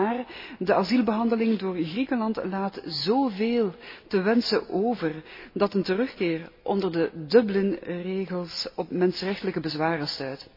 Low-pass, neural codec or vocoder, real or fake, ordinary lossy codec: 5.4 kHz; none; real; MP3, 24 kbps